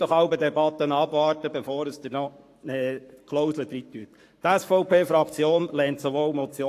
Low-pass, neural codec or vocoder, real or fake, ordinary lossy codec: 14.4 kHz; codec, 44.1 kHz, 7.8 kbps, Pupu-Codec; fake; AAC, 64 kbps